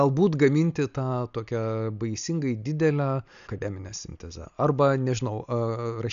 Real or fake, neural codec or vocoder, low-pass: real; none; 7.2 kHz